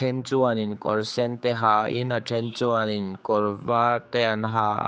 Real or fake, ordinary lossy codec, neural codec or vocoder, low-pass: fake; none; codec, 16 kHz, 4 kbps, X-Codec, HuBERT features, trained on general audio; none